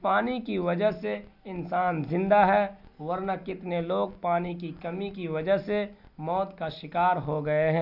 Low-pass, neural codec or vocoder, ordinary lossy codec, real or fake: 5.4 kHz; none; none; real